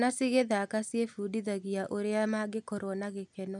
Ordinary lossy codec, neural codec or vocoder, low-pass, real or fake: none; none; 10.8 kHz; real